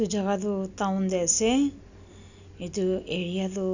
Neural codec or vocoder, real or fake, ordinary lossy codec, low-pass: none; real; none; 7.2 kHz